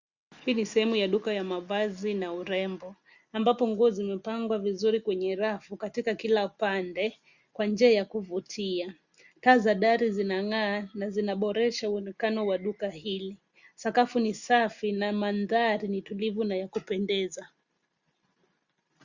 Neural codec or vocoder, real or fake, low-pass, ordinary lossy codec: none; real; 7.2 kHz; Opus, 64 kbps